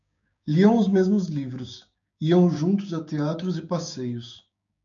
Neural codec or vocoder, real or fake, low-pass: codec, 16 kHz, 6 kbps, DAC; fake; 7.2 kHz